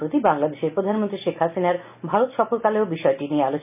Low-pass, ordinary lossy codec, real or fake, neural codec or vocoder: 3.6 kHz; none; real; none